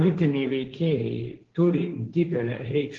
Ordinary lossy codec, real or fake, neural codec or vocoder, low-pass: Opus, 16 kbps; fake; codec, 16 kHz, 1.1 kbps, Voila-Tokenizer; 7.2 kHz